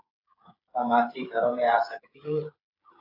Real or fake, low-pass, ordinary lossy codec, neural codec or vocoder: fake; 5.4 kHz; AAC, 32 kbps; codec, 16 kHz, 8 kbps, FreqCodec, smaller model